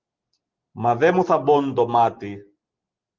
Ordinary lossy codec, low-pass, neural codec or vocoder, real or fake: Opus, 16 kbps; 7.2 kHz; none; real